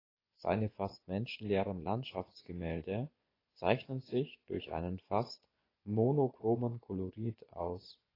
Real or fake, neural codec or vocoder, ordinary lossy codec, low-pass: fake; vocoder, 24 kHz, 100 mel bands, Vocos; AAC, 24 kbps; 5.4 kHz